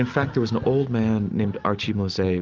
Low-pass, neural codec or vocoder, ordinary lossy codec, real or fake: 7.2 kHz; none; Opus, 32 kbps; real